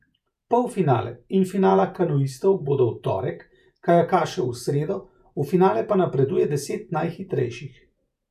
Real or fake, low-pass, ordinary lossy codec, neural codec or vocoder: fake; 14.4 kHz; none; vocoder, 48 kHz, 128 mel bands, Vocos